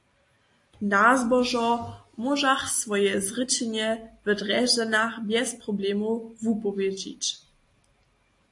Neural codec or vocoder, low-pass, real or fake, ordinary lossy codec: none; 10.8 kHz; real; AAC, 48 kbps